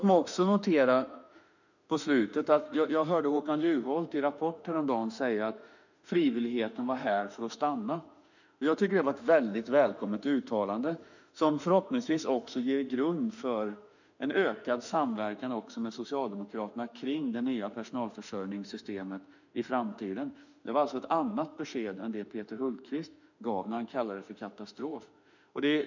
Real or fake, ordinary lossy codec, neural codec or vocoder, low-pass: fake; none; autoencoder, 48 kHz, 32 numbers a frame, DAC-VAE, trained on Japanese speech; 7.2 kHz